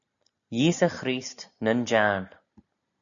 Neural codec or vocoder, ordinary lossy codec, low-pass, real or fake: none; MP3, 96 kbps; 7.2 kHz; real